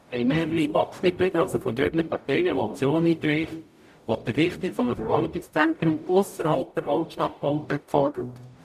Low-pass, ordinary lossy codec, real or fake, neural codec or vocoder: 14.4 kHz; none; fake; codec, 44.1 kHz, 0.9 kbps, DAC